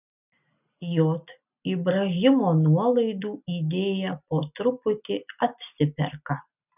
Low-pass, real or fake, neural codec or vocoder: 3.6 kHz; real; none